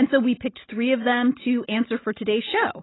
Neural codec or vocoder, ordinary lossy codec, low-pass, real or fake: none; AAC, 16 kbps; 7.2 kHz; real